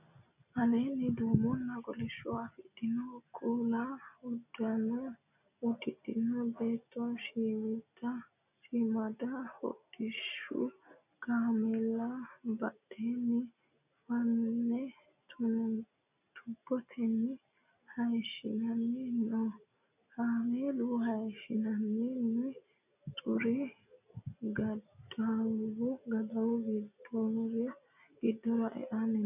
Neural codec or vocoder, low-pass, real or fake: none; 3.6 kHz; real